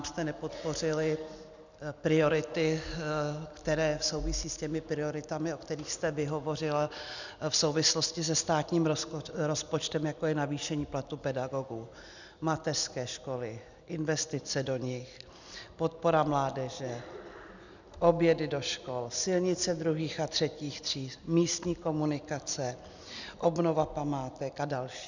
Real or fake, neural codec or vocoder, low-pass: real; none; 7.2 kHz